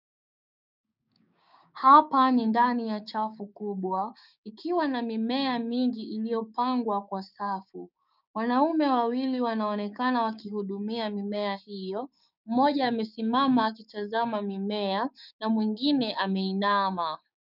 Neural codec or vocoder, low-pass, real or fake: codec, 16 kHz, 6 kbps, DAC; 5.4 kHz; fake